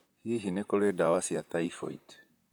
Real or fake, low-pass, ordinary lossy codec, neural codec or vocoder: fake; none; none; vocoder, 44.1 kHz, 128 mel bands, Pupu-Vocoder